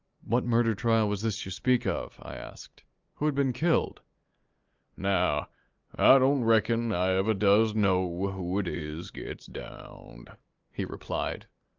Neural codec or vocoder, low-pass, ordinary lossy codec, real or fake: none; 7.2 kHz; Opus, 32 kbps; real